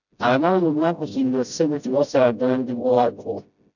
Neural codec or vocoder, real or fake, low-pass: codec, 16 kHz, 0.5 kbps, FreqCodec, smaller model; fake; 7.2 kHz